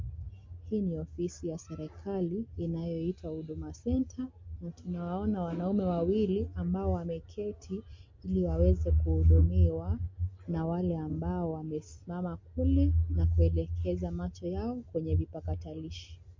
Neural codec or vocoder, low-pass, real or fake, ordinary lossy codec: none; 7.2 kHz; real; MP3, 48 kbps